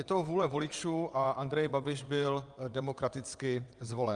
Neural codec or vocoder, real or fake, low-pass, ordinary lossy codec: vocoder, 22.05 kHz, 80 mel bands, WaveNeXt; fake; 9.9 kHz; Opus, 32 kbps